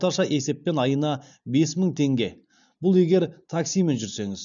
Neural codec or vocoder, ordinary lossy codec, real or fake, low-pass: none; none; real; 7.2 kHz